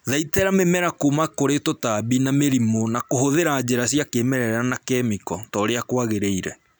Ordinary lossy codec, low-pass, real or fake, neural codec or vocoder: none; none; real; none